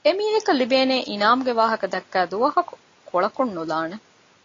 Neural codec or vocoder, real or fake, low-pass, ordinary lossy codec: none; real; 7.2 kHz; AAC, 32 kbps